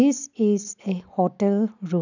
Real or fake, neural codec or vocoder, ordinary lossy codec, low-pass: real; none; none; 7.2 kHz